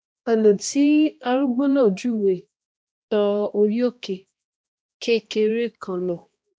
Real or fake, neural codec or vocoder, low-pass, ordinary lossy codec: fake; codec, 16 kHz, 1 kbps, X-Codec, HuBERT features, trained on balanced general audio; none; none